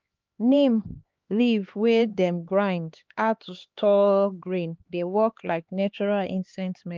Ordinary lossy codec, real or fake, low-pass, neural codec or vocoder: Opus, 24 kbps; fake; 7.2 kHz; codec, 16 kHz, 2 kbps, X-Codec, HuBERT features, trained on LibriSpeech